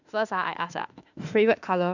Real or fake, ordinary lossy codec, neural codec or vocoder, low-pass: fake; none; autoencoder, 48 kHz, 32 numbers a frame, DAC-VAE, trained on Japanese speech; 7.2 kHz